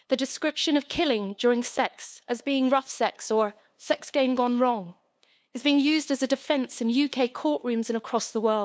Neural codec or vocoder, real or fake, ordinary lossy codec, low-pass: codec, 16 kHz, 2 kbps, FunCodec, trained on LibriTTS, 25 frames a second; fake; none; none